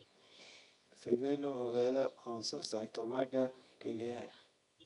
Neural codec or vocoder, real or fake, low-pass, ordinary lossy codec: codec, 24 kHz, 0.9 kbps, WavTokenizer, medium music audio release; fake; 10.8 kHz; MP3, 96 kbps